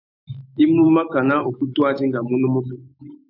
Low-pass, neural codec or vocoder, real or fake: 5.4 kHz; autoencoder, 48 kHz, 128 numbers a frame, DAC-VAE, trained on Japanese speech; fake